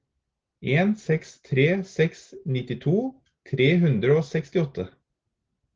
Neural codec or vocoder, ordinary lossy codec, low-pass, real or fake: none; Opus, 16 kbps; 7.2 kHz; real